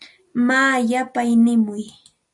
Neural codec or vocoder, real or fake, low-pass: none; real; 10.8 kHz